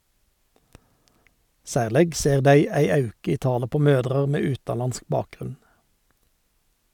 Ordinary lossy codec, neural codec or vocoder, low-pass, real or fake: none; none; 19.8 kHz; real